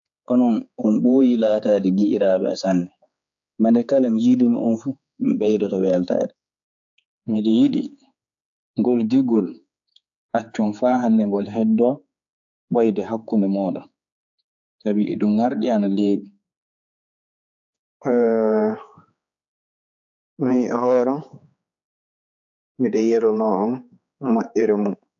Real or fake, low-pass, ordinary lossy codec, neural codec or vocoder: fake; 7.2 kHz; AAC, 64 kbps; codec, 16 kHz, 4 kbps, X-Codec, HuBERT features, trained on general audio